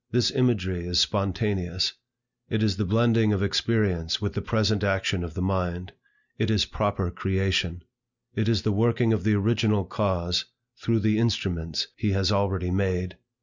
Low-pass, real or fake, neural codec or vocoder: 7.2 kHz; real; none